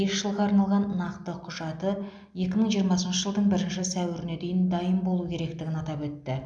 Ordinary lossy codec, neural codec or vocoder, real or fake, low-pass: none; none; real; 9.9 kHz